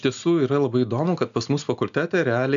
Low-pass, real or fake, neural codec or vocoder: 7.2 kHz; real; none